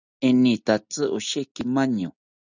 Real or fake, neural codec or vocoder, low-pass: real; none; 7.2 kHz